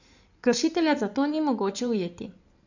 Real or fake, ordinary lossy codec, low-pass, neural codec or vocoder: fake; none; 7.2 kHz; codec, 16 kHz in and 24 kHz out, 2.2 kbps, FireRedTTS-2 codec